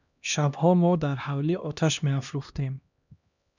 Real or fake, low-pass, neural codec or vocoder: fake; 7.2 kHz; codec, 16 kHz, 1 kbps, X-Codec, HuBERT features, trained on LibriSpeech